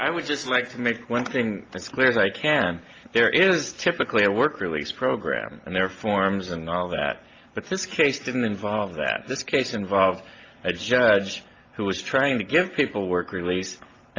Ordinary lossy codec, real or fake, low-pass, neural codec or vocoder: Opus, 24 kbps; real; 7.2 kHz; none